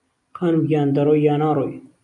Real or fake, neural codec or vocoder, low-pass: real; none; 10.8 kHz